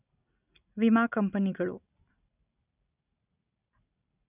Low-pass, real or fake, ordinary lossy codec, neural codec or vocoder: 3.6 kHz; real; none; none